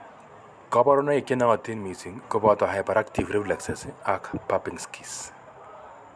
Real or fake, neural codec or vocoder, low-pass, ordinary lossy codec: real; none; none; none